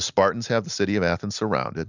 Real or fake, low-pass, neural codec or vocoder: real; 7.2 kHz; none